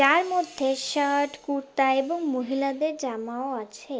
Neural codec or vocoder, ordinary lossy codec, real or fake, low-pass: none; none; real; none